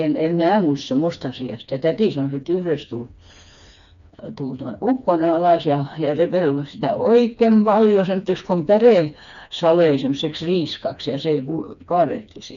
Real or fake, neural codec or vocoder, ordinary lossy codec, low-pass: fake; codec, 16 kHz, 2 kbps, FreqCodec, smaller model; none; 7.2 kHz